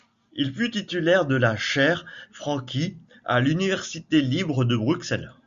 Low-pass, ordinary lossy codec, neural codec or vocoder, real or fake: 7.2 kHz; Opus, 64 kbps; none; real